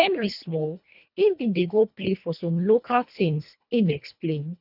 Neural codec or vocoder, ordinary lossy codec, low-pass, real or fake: codec, 24 kHz, 1.5 kbps, HILCodec; none; 5.4 kHz; fake